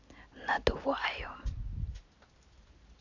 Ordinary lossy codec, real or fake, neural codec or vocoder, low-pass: AAC, 48 kbps; real; none; 7.2 kHz